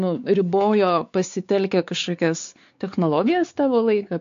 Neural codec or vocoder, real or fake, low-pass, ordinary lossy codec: codec, 16 kHz, 4 kbps, X-Codec, WavLM features, trained on Multilingual LibriSpeech; fake; 7.2 kHz; AAC, 64 kbps